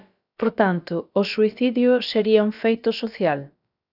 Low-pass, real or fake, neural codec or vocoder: 5.4 kHz; fake; codec, 16 kHz, about 1 kbps, DyCAST, with the encoder's durations